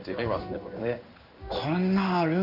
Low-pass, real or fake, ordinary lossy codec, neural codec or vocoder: 5.4 kHz; fake; none; codec, 16 kHz in and 24 kHz out, 1 kbps, XY-Tokenizer